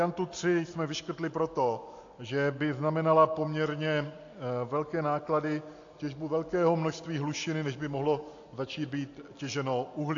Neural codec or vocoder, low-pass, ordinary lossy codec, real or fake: none; 7.2 kHz; AAC, 48 kbps; real